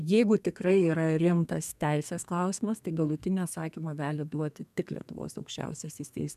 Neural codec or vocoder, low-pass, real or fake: codec, 32 kHz, 1.9 kbps, SNAC; 14.4 kHz; fake